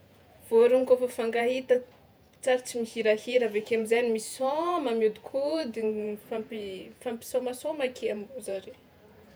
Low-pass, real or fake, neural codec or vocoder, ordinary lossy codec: none; fake; vocoder, 48 kHz, 128 mel bands, Vocos; none